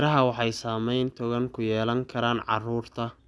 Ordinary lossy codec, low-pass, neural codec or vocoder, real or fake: none; none; none; real